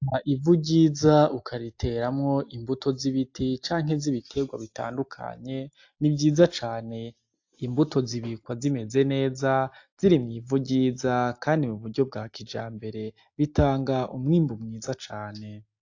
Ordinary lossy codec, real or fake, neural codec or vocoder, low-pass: AAC, 48 kbps; real; none; 7.2 kHz